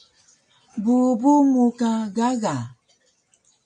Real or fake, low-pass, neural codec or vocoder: real; 9.9 kHz; none